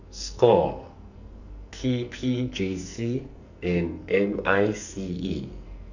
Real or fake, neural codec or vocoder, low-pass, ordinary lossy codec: fake; codec, 44.1 kHz, 2.6 kbps, SNAC; 7.2 kHz; none